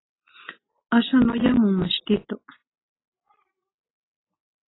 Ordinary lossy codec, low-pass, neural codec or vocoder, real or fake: AAC, 16 kbps; 7.2 kHz; none; real